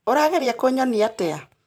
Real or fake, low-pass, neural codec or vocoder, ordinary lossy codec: fake; none; vocoder, 44.1 kHz, 128 mel bands every 512 samples, BigVGAN v2; none